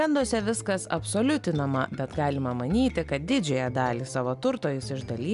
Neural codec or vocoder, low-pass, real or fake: none; 10.8 kHz; real